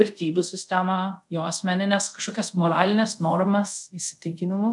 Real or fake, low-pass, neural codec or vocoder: fake; 10.8 kHz; codec, 24 kHz, 0.5 kbps, DualCodec